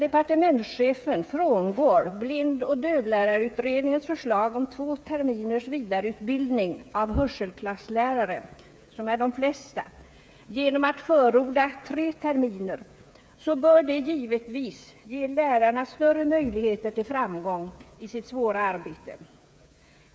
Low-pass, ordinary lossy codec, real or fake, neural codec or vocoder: none; none; fake; codec, 16 kHz, 8 kbps, FreqCodec, smaller model